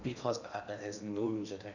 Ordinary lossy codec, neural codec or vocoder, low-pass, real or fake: none; codec, 16 kHz in and 24 kHz out, 0.8 kbps, FocalCodec, streaming, 65536 codes; 7.2 kHz; fake